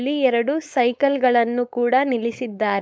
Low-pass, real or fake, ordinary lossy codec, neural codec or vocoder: none; fake; none; codec, 16 kHz, 4.8 kbps, FACodec